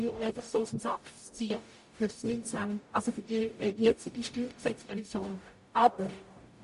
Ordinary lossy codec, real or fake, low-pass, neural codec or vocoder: MP3, 48 kbps; fake; 14.4 kHz; codec, 44.1 kHz, 0.9 kbps, DAC